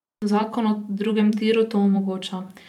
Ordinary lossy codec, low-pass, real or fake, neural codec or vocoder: none; 19.8 kHz; fake; vocoder, 44.1 kHz, 128 mel bands every 512 samples, BigVGAN v2